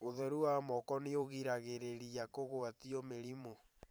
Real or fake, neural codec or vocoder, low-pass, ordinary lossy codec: fake; vocoder, 44.1 kHz, 128 mel bands every 512 samples, BigVGAN v2; none; none